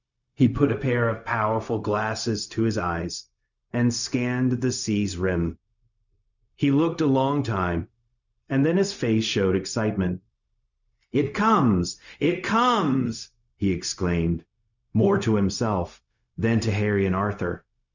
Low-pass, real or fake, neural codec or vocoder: 7.2 kHz; fake; codec, 16 kHz, 0.4 kbps, LongCat-Audio-Codec